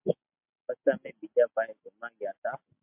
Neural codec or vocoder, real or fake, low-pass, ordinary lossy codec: none; real; 3.6 kHz; MP3, 32 kbps